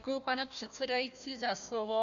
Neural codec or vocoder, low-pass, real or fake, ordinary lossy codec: codec, 16 kHz, 1 kbps, FunCodec, trained on Chinese and English, 50 frames a second; 7.2 kHz; fake; MP3, 64 kbps